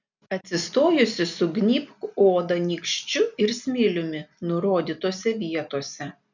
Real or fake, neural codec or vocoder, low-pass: real; none; 7.2 kHz